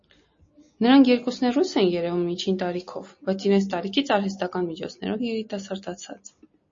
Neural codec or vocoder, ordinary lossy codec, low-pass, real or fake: none; MP3, 32 kbps; 7.2 kHz; real